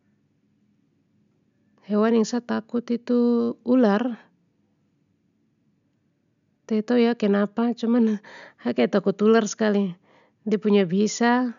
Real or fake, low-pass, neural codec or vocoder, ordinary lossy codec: real; 7.2 kHz; none; none